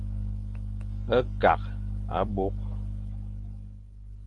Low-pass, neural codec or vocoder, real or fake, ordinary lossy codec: 10.8 kHz; none; real; Opus, 24 kbps